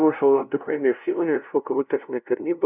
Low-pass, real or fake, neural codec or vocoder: 3.6 kHz; fake; codec, 16 kHz, 0.5 kbps, FunCodec, trained on LibriTTS, 25 frames a second